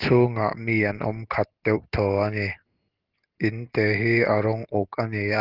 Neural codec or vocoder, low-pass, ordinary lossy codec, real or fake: none; 5.4 kHz; Opus, 16 kbps; real